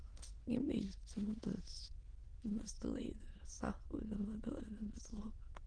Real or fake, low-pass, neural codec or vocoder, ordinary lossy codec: fake; 9.9 kHz; autoencoder, 22.05 kHz, a latent of 192 numbers a frame, VITS, trained on many speakers; Opus, 16 kbps